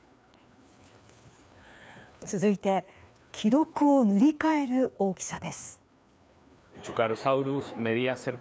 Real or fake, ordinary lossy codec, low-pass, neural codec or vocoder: fake; none; none; codec, 16 kHz, 2 kbps, FreqCodec, larger model